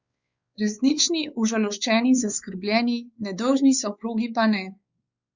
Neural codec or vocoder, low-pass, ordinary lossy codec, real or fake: codec, 16 kHz, 4 kbps, X-Codec, WavLM features, trained on Multilingual LibriSpeech; 7.2 kHz; Opus, 64 kbps; fake